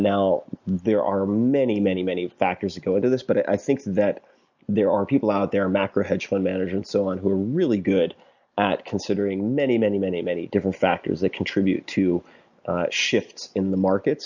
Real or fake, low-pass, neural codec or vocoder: real; 7.2 kHz; none